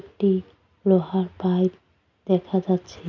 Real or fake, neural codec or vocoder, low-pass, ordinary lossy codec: real; none; 7.2 kHz; none